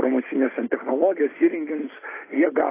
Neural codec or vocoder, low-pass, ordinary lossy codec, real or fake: none; 3.6 kHz; AAC, 16 kbps; real